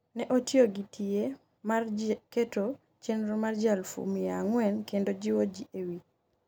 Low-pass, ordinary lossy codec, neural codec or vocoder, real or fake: none; none; none; real